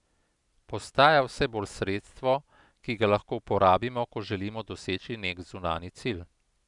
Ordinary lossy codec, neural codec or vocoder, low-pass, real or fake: none; none; 10.8 kHz; real